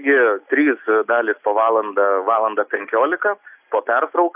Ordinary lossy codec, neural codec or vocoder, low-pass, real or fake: AAC, 32 kbps; none; 3.6 kHz; real